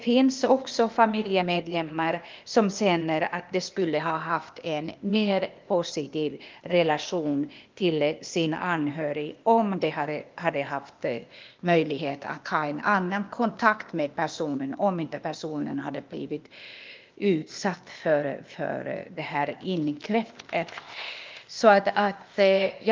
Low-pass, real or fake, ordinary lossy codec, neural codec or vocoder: 7.2 kHz; fake; Opus, 24 kbps; codec, 16 kHz, 0.8 kbps, ZipCodec